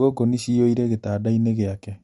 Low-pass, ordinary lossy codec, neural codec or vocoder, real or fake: 19.8 kHz; MP3, 48 kbps; none; real